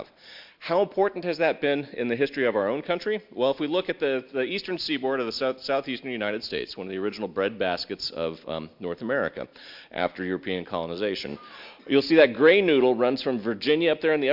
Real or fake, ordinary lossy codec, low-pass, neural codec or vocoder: real; MP3, 48 kbps; 5.4 kHz; none